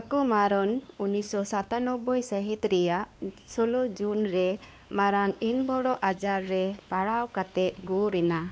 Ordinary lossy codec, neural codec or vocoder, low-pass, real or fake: none; codec, 16 kHz, 2 kbps, X-Codec, WavLM features, trained on Multilingual LibriSpeech; none; fake